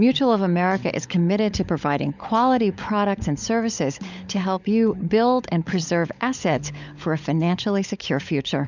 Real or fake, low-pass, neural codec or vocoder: fake; 7.2 kHz; codec, 16 kHz, 16 kbps, FunCodec, trained on LibriTTS, 50 frames a second